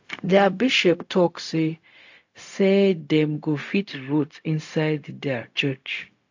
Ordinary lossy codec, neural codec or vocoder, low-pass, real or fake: AAC, 48 kbps; codec, 16 kHz, 0.4 kbps, LongCat-Audio-Codec; 7.2 kHz; fake